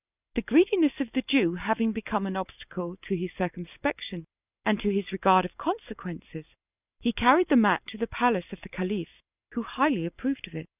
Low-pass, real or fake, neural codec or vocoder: 3.6 kHz; real; none